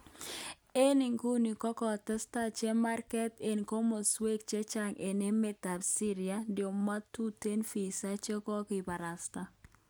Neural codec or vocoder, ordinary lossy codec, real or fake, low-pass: none; none; real; none